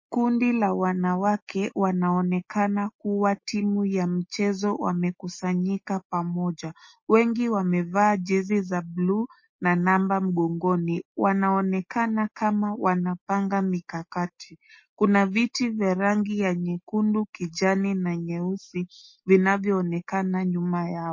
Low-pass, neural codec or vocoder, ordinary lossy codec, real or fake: 7.2 kHz; none; MP3, 32 kbps; real